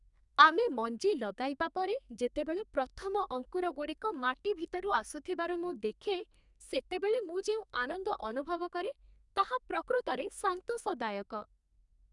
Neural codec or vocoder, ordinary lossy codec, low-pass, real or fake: codec, 32 kHz, 1.9 kbps, SNAC; none; 10.8 kHz; fake